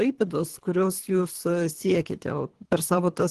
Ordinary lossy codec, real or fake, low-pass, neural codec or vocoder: Opus, 16 kbps; fake; 10.8 kHz; codec, 24 kHz, 3 kbps, HILCodec